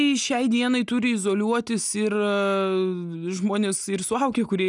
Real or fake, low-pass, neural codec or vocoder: real; 10.8 kHz; none